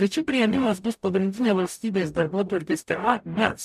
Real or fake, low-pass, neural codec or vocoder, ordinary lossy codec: fake; 14.4 kHz; codec, 44.1 kHz, 0.9 kbps, DAC; MP3, 96 kbps